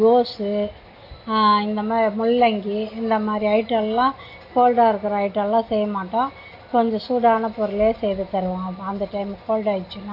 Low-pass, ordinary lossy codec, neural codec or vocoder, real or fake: 5.4 kHz; AAC, 32 kbps; none; real